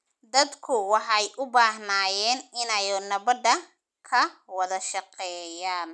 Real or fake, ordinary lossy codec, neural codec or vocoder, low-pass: real; none; none; none